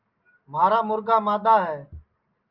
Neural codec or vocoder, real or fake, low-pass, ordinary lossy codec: none; real; 5.4 kHz; Opus, 24 kbps